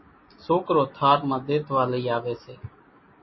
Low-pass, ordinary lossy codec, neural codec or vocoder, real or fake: 7.2 kHz; MP3, 24 kbps; none; real